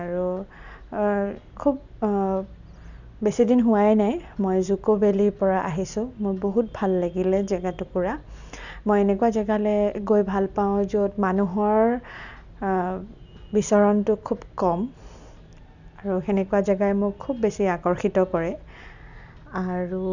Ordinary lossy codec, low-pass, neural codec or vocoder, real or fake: none; 7.2 kHz; none; real